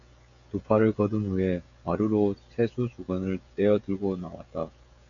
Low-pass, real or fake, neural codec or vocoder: 7.2 kHz; fake; codec, 16 kHz, 16 kbps, FreqCodec, smaller model